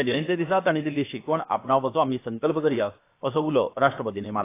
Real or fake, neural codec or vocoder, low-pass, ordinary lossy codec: fake; codec, 16 kHz, about 1 kbps, DyCAST, with the encoder's durations; 3.6 kHz; AAC, 24 kbps